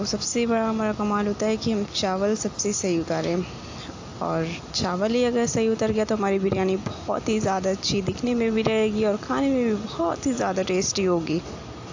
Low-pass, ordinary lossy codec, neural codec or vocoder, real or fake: 7.2 kHz; AAC, 48 kbps; none; real